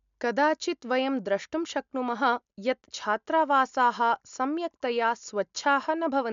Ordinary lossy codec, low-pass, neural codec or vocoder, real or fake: MP3, 64 kbps; 7.2 kHz; none; real